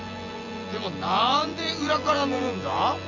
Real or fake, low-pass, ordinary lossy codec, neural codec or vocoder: fake; 7.2 kHz; none; vocoder, 24 kHz, 100 mel bands, Vocos